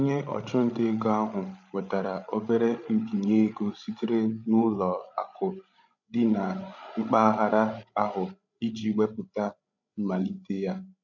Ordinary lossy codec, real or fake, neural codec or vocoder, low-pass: none; fake; codec, 16 kHz, 16 kbps, FreqCodec, larger model; 7.2 kHz